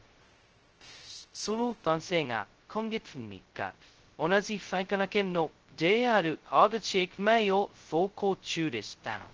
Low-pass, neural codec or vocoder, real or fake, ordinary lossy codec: 7.2 kHz; codec, 16 kHz, 0.2 kbps, FocalCodec; fake; Opus, 16 kbps